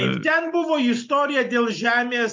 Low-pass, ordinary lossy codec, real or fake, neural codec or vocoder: 7.2 kHz; MP3, 48 kbps; real; none